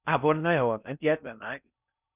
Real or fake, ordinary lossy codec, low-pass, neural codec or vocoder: fake; none; 3.6 kHz; codec, 16 kHz in and 24 kHz out, 0.6 kbps, FocalCodec, streaming, 4096 codes